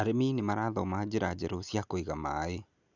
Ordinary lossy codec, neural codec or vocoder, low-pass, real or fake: none; none; 7.2 kHz; real